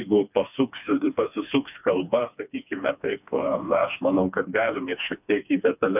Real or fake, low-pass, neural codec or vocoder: fake; 3.6 kHz; codec, 16 kHz, 2 kbps, FreqCodec, smaller model